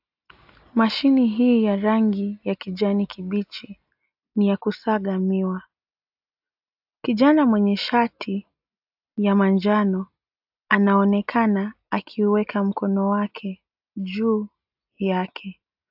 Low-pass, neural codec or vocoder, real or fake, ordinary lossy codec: 5.4 kHz; none; real; AAC, 48 kbps